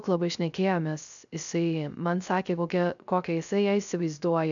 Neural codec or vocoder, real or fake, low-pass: codec, 16 kHz, 0.3 kbps, FocalCodec; fake; 7.2 kHz